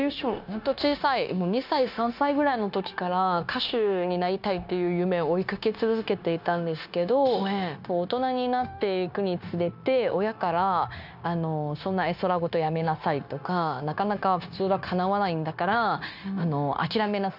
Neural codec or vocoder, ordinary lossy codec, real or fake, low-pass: codec, 16 kHz, 0.9 kbps, LongCat-Audio-Codec; none; fake; 5.4 kHz